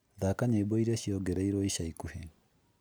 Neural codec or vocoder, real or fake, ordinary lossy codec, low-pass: none; real; none; none